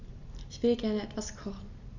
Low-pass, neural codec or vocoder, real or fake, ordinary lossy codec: 7.2 kHz; none; real; none